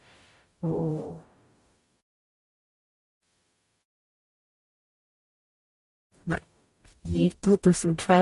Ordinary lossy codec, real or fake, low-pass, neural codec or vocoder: MP3, 48 kbps; fake; 14.4 kHz; codec, 44.1 kHz, 0.9 kbps, DAC